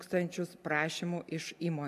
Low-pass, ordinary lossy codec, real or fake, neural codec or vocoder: 14.4 kHz; MP3, 96 kbps; real; none